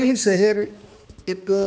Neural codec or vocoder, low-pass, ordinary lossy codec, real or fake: codec, 16 kHz, 2 kbps, X-Codec, HuBERT features, trained on balanced general audio; none; none; fake